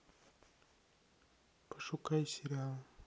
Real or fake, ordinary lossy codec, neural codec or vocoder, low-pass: real; none; none; none